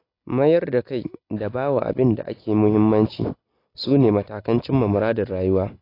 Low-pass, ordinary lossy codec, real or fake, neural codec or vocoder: 5.4 kHz; AAC, 32 kbps; real; none